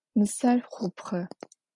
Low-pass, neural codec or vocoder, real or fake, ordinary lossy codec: 10.8 kHz; none; real; AAC, 64 kbps